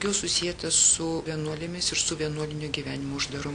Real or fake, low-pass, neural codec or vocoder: real; 9.9 kHz; none